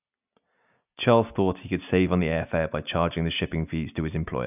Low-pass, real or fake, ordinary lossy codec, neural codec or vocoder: 3.6 kHz; real; none; none